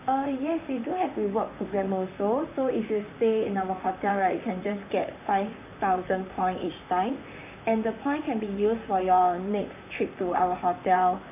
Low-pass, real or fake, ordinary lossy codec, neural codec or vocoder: 3.6 kHz; fake; none; vocoder, 44.1 kHz, 128 mel bands, Pupu-Vocoder